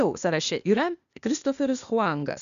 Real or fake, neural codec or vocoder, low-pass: fake; codec, 16 kHz, 0.8 kbps, ZipCodec; 7.2 kHz